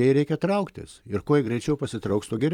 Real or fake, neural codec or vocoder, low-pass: fake; vocoder, 44.1 kHz, 128 mel bands every 256 samples, BigVGAN v2; 19.8 kHz